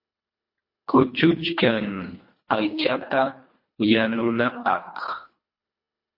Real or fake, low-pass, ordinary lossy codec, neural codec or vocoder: fake; 5.4 kHz; MP3, 32 kbps; codec, 24 kHz, 1.5 kbps, HILCodec